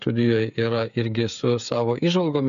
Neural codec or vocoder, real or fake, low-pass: codec, 16 kHz, 8 kbps, FreqCodec, smaller model; fake; 7.2 kHz